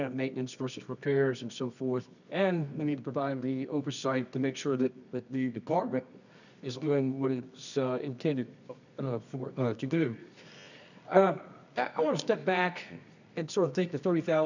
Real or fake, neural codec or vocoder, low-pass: fake; codec, 24 kHz, 0.9 kbps, WavTokenizer, medium music audio release; 7.2 kHz